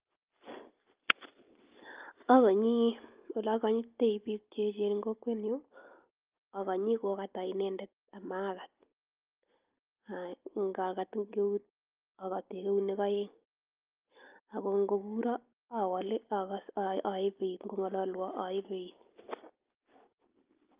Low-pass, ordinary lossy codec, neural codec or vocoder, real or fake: 3.6 kHz; Opus, 24 kbps; none; real